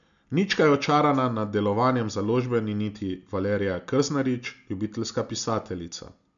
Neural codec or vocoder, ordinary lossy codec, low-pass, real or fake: none; none; 7.2 kHz; real